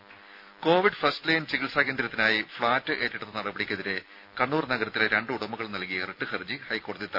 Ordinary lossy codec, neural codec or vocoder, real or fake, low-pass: none; none; real; 5.4 kHz